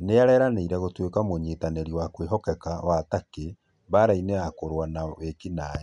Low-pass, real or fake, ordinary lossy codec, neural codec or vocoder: 10.8 kHz; real; none; none